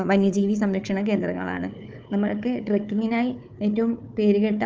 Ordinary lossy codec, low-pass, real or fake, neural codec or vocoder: Opus, 24 kbps; 7.2 kHz; fake; codec, 16 kHz, 4 kbps, FunCodec, trained on Chinese and English, 50 frames a second